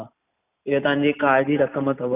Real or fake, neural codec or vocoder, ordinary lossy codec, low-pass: real; none; none; 3.6 kHz